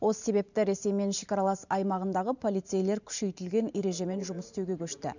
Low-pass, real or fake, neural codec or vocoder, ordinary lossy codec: 7.2 kHz; fake; vocoder, 44.1 kHz, 128 mel bands every 512 samples, BigVGAN v2; none